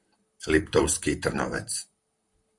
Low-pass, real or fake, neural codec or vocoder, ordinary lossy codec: 10.8 kHz; fake; vocoder, 44.1 kHz, 128 mel bands, Pupu-Vocoder; Opus, 64 kbps